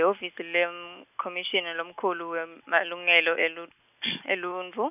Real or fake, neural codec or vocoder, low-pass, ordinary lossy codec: real; none; 3.6 kHz; none